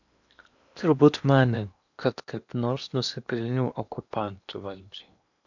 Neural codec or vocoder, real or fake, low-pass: codec, 16 kHz in and 24 kHz out, 0.8 kbps, FocalCodec, streaming, 65536 codes; fake; 7.2 kHz